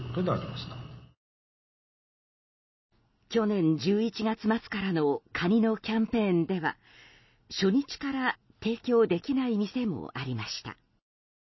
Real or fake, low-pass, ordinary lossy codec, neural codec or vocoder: real; 7.2 kHz; MP3, 24 kbps; none